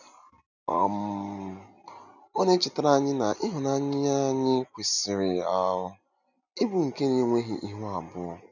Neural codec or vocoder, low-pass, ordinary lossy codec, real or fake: none; 7.2 kHz; none; real